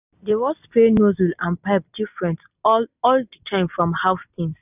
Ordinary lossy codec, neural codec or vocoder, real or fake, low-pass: none; none; real; 3.6 kHz